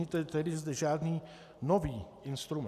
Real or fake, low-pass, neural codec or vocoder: real; 14.4 kHz; none